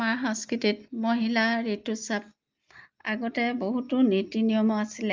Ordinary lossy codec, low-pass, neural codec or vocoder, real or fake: Opus, 24 kbps; 7.2 kHz; none; real